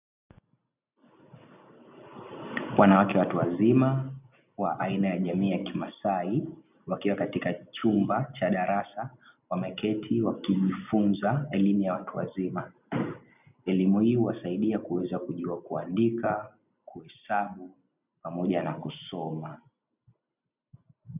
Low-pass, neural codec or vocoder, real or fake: 3.6 kHz; none; real